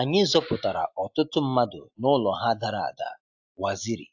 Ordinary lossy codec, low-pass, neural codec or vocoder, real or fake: AAC, 48 kbps; 7.2 kHz; none; real